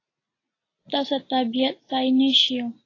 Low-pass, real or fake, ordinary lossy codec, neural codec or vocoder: 7.2 kHz; real; AAC, 32 kbps; none